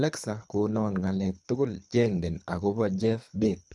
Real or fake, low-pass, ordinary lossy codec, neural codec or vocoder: fake; none; none; codec, 24 kHz, 3 kbps, HILCodec